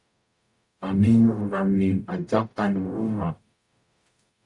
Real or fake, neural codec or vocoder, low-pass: fake; codec, 44.1 kHz, 0.9 kbps, DAC; 10.8 kHz